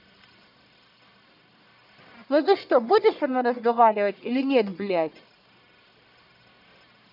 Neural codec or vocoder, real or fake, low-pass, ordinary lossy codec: codec, 44.1 kHz, 1.7 kbps, Pupu-Codec; fake; 5.4 kHz; none